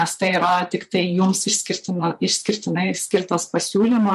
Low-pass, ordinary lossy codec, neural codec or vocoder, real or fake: 14.4 kHz; MP3, 64 kbps; vocoder, 44.1 kHz, 128 mel bands, Pupu-Vocoder; fake